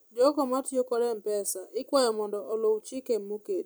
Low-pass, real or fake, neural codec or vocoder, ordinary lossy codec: none; fake; vocoder, 44.1 kHz, 128 mel bands every 256 samples, BigVGAN v2; none